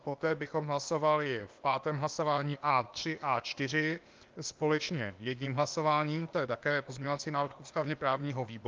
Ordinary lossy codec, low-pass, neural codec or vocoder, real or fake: Opus, 24 kbps; 7.2 kHz; codec, 16 kHz, 0.8 kbps, ZipCodec; fake